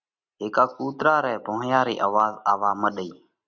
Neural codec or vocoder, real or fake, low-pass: none; real; 7.2 kHz